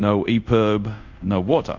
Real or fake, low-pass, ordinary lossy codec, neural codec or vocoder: fake; 7.2 kHz; MP3, 64 kbps; codec, 24 kHz, 0.5 kbps, DualCodec